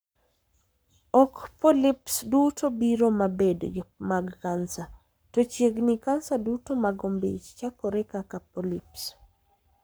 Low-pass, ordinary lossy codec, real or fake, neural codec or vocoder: none; none; fake; codec, 44.1 kHz, 7.8 kbps, Pupu-Codec